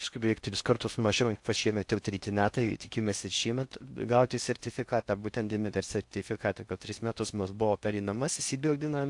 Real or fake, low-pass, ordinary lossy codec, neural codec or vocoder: fake; 10.8 kHz; AAC, 64 kbps; codec, 16 kHz in and 24 kHz out, 0.6 kbps, FocalCodec, streaming, 2048 codes